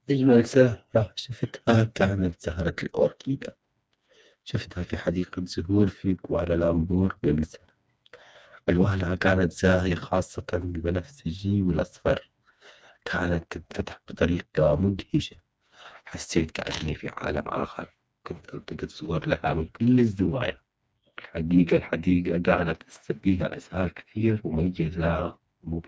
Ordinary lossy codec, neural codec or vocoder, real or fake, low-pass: none; codec, 16 kHz, 2 kbps, FreqCodec, smaller model; fake; none